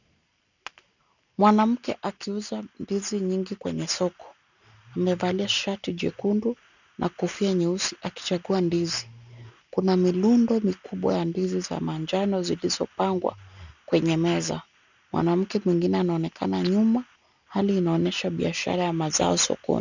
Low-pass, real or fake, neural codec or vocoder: 7.2 kHz; real; none